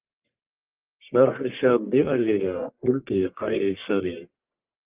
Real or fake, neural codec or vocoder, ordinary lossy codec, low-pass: fake; codec, 44.1 kHz, 1.7 kbps, Pupu-Codec; Opus, 24 kbps; 3.6 kHz